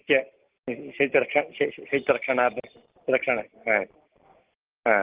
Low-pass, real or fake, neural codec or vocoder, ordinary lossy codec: 3.6 kHz; real; none; Opus, 16 kbps